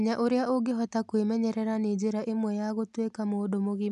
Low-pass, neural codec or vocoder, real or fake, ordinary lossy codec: 10.8 kHz; none; real; none